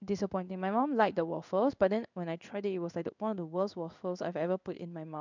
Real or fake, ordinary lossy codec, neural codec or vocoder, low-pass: fake; none; codec, 16 kHz in and 24 kHz out, 1 kbps, XY-Tokenizer; 7.2 kHz